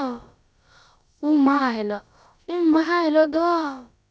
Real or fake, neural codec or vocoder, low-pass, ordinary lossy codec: fake; codec, 16 kHz, about 1 kbps, DyCAST, with the encoder's durations; none; none